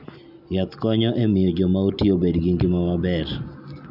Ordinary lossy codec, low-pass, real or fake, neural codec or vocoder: none; 5.4 kHz; real; none